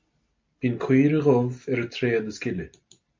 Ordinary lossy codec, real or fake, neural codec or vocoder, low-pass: MP3, 48 kbps; real; none; 7.2 kHz